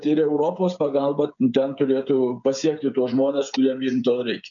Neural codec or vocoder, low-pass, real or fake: codec, 16 kHz, 8 kbps, FreqCodec, smaller model; 7.2 kHz; fake